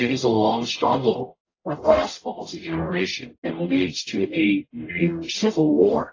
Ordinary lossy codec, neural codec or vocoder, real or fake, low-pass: AAC, 32 kbps; codec, 44.1 kHz, 0.9 kbps, DAC; fake; 7.2 kHz